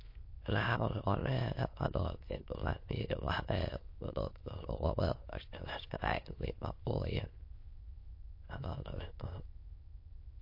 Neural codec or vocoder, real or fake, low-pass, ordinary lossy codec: autoencoder, 22.05 kHz, a latent of 192 numbers a frame, VITS, trained on many speakers; fake; 5.4 kHz; MP3, 32 kbps